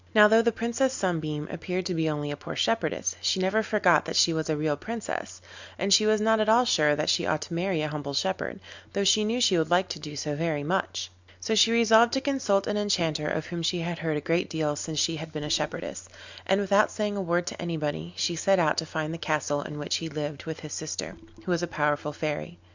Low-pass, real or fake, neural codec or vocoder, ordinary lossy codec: 7.2 kHz; real; none; Opus, 64 kbps